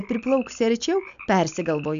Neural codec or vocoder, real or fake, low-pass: none; real; 7.2 kHz